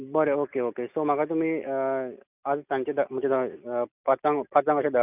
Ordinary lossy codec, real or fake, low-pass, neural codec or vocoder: none; real; 3.6 kHz; none